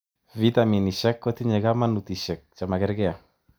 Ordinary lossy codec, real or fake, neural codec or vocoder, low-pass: none; real; none; none